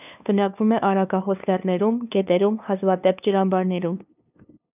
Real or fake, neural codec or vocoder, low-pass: fake; codec, 16 kHz, 2 kbps, FunCodec, trained on LibriTTS, 25 frames a second; 3.6 kHz